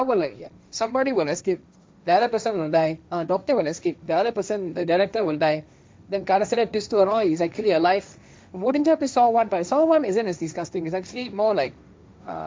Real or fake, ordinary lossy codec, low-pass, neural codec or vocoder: fake; none; none; codec, 16 kHz, 1.1 kbps, Voila-Tokenizer